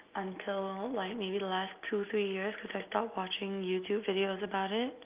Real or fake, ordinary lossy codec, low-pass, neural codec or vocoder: real; Opus, 24 kbps; 3.6 kHz; none